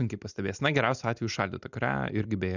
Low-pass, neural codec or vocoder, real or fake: 7.2 kHz; none; real